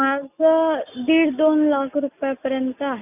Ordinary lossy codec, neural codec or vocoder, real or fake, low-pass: none; none; real; 3.6 kHz